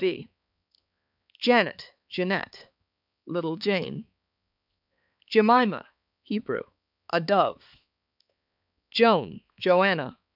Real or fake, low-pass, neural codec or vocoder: fake; 5.4 kHz; codec, 16 kHz, 4 kbps, X-Codec, HuBERT features, trained on balanced general audio